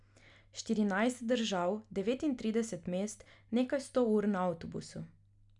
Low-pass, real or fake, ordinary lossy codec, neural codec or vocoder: 10.8 kHz; real; none; none